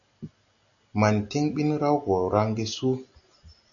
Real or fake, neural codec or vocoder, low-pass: real; none; 7.2 kHz